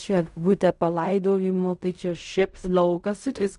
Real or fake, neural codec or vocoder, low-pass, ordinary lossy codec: fake; codec, 16 kHz in and 24 kHz out, 0.4 kbps, LongCat-Audio-Codec, fine tuned four codebook decoder; 10.8 kHz; MP3, 96 kbps